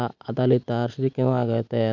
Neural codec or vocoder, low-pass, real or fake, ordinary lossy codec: none; 7.2 kHz; real; none